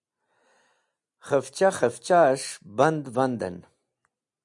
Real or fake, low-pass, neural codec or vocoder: real; 10.8 kHz; none